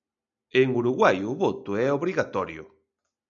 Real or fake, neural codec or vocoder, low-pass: real; none; 7.2 kHz